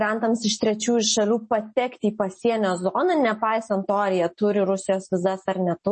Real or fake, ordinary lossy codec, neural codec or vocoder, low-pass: real; MP3, 32 kbps; none; 10.8 kHz